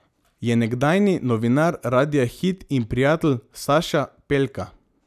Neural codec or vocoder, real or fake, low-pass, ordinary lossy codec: none; real; 14.4 kHz; none